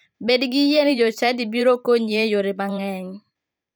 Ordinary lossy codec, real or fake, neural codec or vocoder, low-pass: none; fake; vocoder, 44.1 kHz, 128 mel bands every 512 samples, BigVGAN v2; none